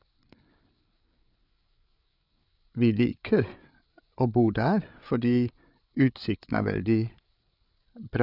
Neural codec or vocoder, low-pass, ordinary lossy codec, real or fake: codec, 16 kHz, 8 kbps, FreqCodec, larger model; 5.4 kHz; none; fake